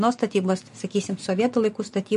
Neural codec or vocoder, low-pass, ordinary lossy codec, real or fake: none; 14.4 kHz; MP3, 48 kbps; real